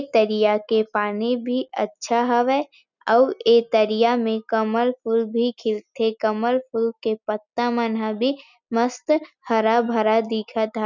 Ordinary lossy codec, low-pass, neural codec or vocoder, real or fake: none; 7.2 kHz; none; real